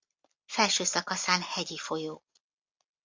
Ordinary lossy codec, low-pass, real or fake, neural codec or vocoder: MP3, 64 kbps; 7.2 kHz; real; none